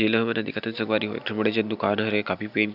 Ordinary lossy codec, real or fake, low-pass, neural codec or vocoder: none; real; 5.4 kHz; none